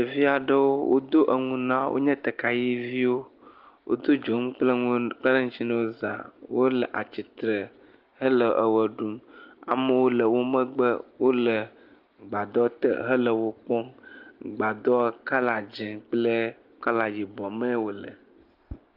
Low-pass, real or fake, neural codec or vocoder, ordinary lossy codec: 5.4 kHz; real; none; Opus, 24 kbps